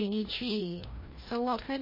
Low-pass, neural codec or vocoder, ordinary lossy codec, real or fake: 5.4 kHz; codec, 16 kHz, 1 kbps, FreqCodec, larger model; MP3, 24 kbps; fake